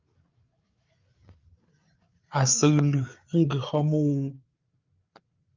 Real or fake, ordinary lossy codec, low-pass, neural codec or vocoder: fake; Opus, 24 kbps; 7.2 kHz; codec, 16 kHz, 8 kbps, FreqCodec, larger model